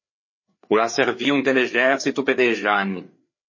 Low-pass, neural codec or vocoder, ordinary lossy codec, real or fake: 7.2 kHz; codec, 16 kHz, 4 kbps, FreqCodec, larger model; MP3, 32 kbps; fake